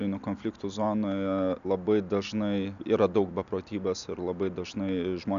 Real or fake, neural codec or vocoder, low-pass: real; none; 7.2 kHz